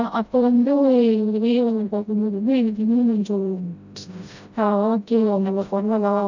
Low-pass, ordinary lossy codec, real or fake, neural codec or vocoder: 7.2 kHz; none; fake; codec, 16 kHz, 0.5 kbps, FreqCodec, smaller model